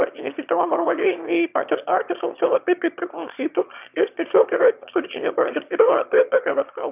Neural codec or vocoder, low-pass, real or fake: autoencoder, 22.05 kHz, a latent of 192 numbers a frame, VITS, trained on one speaker; 3.6 kHz; fake